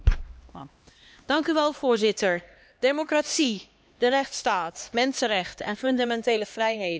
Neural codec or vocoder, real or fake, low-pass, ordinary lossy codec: codec, 16 kHz, 2 kbps, X-Codec, HuBERT features, trained on LibriSpeech; fake; none; none